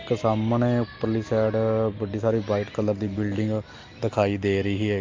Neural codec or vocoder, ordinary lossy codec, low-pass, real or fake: none; Opus, 32 kbps; 7.2 kHz; real